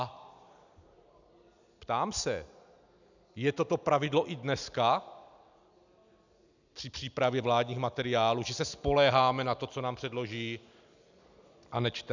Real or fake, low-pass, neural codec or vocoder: real; 7.2 kHz; none